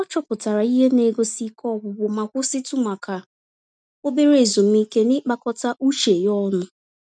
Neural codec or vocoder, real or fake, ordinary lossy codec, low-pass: none; real; none; 9.9 kHz